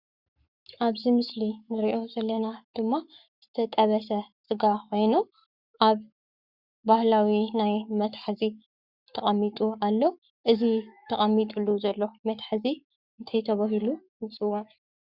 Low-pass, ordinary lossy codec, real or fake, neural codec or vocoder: 5.4 kHz; Opus, 64 kbps; fake; codec, 44.1 kHz, 7.8 kbps, Pupu-Codec